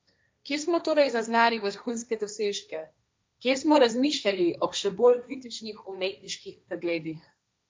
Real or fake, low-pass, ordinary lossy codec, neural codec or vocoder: fake; none; none; codec, 16 kHz, 1.1 kbps, Voila-Tokenizer